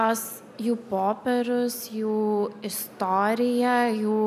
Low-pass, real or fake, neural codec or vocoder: 14.4 kHz; real; none